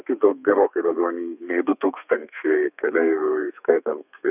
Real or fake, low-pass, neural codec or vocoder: fake; 3.6 kHz; codec, 32 kHz, 1.9 kbps, SNAC